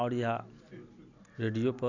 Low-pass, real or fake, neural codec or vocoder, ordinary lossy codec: 7.2 kHz; real; none; none